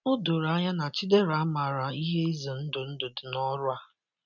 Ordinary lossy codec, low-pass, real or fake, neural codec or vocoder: none; 7.2 kHz; real; none